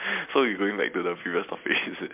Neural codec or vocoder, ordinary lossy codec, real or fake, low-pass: none; MP3, 32 kbps; real; 3.6 kHz